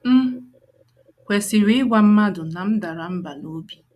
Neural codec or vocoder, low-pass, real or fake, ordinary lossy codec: none; 14.4 kHz; real; none